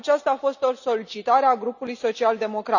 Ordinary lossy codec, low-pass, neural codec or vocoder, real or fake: none; 7.2 kHz; none; real